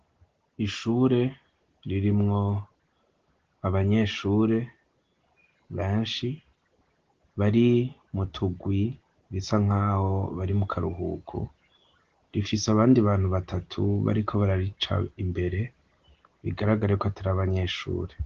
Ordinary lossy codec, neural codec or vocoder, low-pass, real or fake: Opus, 16 kbps; none; 7.2 kHz; real